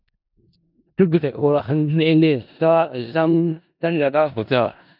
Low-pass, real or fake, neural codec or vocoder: 5.4 kHz; fake; codec, 16 kHz in and 24 kHz out, 0.4 kbps, LongCat-Audio-Codec, four codebook decoder